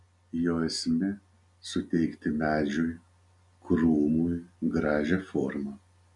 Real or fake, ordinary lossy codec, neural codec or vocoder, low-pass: real; MP3, 96 kbps; none; 10.8 kHz